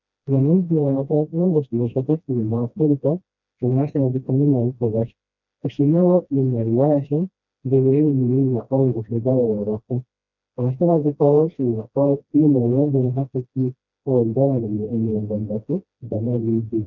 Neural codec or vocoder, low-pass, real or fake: codec, 16 kHz, 1 kbps, FreqCodec, smaller model; 7.2 kHz; fake